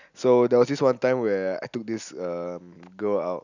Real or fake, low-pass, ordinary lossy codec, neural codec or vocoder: real; 7.2 kHz; none; none